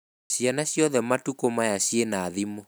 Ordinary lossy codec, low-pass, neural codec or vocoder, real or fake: none; none; none; real